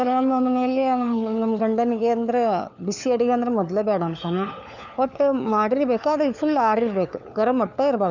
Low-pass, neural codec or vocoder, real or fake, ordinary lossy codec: 7.2 kHz; codec, 16 kHz, 4 kbps, FunCodec, trained on LibriTTS, 50 frames a second; fake; none